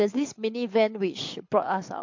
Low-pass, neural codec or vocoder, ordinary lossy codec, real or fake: 7.2 kHz; codec, 24 kHz, 6 kbps, HILCodec; MP3, 48 kbps; fake